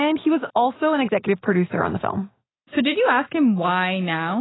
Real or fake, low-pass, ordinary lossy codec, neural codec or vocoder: real; 7.2 kHz; AAC, 16 kbps; none